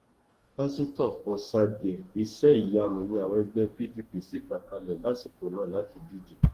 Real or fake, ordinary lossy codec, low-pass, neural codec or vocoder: fake; Opus, 24 kbps; 14.4 kHz; codec, 44.1 kHz, 2.6 kbps, DAC